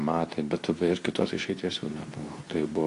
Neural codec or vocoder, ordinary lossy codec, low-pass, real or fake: codec, 24 kHz, 0.9 kbps, WavTokenizer, medium speech release version 2; AAC, 48 kbps; 10.8 kHz; fake